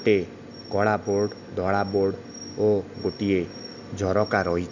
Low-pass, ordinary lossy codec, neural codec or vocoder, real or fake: 7.2 kHz; none; none; real